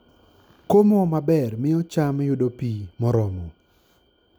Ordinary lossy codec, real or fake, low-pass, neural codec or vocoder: none; real; none; none